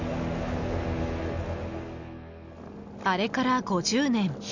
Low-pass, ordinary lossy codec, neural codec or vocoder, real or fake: 7.2 kHz; none; none; real